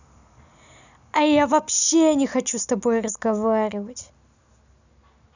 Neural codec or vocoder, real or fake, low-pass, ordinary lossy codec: none; real; 7.2 kHz; none